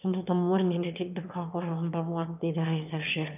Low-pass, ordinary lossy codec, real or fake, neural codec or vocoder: 3.6 kHz; none; fake; autoencoder, 22.05 kHz, a latent of 192 numbers a frame, VITS, trained on one speaker